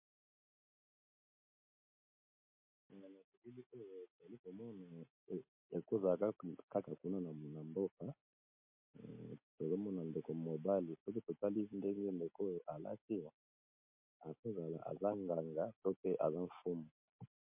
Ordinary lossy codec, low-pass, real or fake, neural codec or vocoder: MP3, 32 kbps; 3.6 kHz; real; none